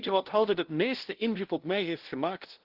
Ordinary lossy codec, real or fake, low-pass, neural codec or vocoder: Opus, 16 kbps; fake; 5.4 kHz; codec, 16 kHz, 0.5 kbps, FunCodec, trained on LibriTTS, 25 frames a second